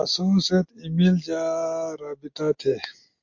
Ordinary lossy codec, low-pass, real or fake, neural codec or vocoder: MP3, 48 kbps; 7.2 kHz; real; none